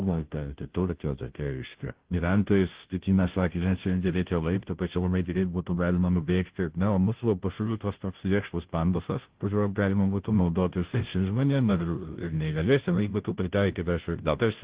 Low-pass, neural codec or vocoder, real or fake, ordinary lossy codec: 3.6 kHz; codec, 16 kHz, 0.5 kbps, FunCodec, trained on Chinese and English, 25 frames a second; fake; Opus, 16 kbps